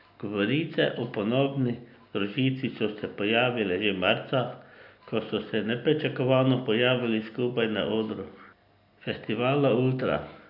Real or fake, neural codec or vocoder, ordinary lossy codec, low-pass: real; none; none; 5.4 kHz